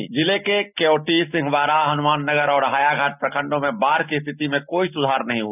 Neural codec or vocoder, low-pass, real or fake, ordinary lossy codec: none; 3.6 kHz; real; none